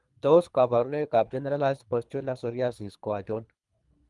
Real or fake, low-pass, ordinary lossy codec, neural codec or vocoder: fake; 10.8 kHz; Opus, 32 kbps; codec, 24 kHz, 3 kbps, HILCodec